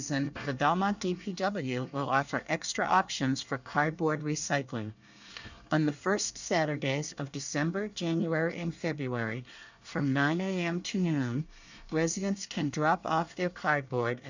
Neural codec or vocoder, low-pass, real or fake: codec, 24 kHz, 1 kbps, SNAC; 7.2 kHz; fake